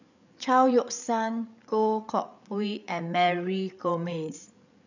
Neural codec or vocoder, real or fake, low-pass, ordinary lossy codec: codec, 16 kHz, 8 kbps, FreqCodec, larger model; fake; 7.2 kHz; none